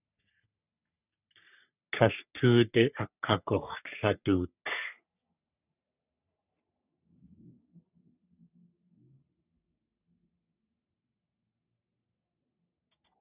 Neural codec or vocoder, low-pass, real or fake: codec, 44.1 kHz, 3.4 kbps, Pupu-Codec; 3.6 kHz; fake